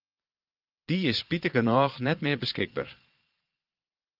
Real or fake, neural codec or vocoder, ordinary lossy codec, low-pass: fake; vocoder, 22.05 kHz, 80 mel bands, Vocos; Opus, 32 kbps; 5.4 kHz